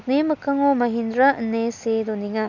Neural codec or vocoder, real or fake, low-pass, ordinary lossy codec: none; real; 7.2 kHz; none